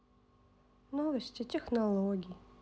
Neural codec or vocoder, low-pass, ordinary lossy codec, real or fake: none; none; none; real